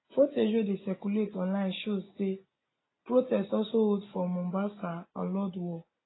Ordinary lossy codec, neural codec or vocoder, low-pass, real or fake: AAC, 16 kbps; none; 7.2 kHz; real